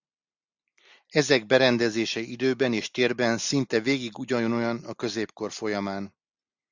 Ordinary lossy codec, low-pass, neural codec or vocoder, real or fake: Opus, 64 kbps; 7.2 kHz; none; real